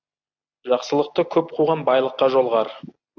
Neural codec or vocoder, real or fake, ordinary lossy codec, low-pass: none; real; AAC, 48 kbps; 7.2 kHz